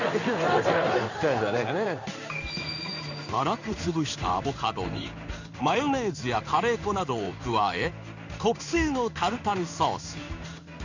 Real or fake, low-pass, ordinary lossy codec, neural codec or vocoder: fake; 7.2 kHz; none; codec, 16 kHz in and 24 kHz out, 1 kbps, XY-Tokenizer